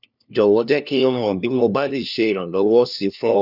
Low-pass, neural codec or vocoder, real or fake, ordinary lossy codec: 5.4 kHz; codec, 16 kHz, 1 kbps, FunCodec, trained on LibriTTS, 50 frames a second; fake; none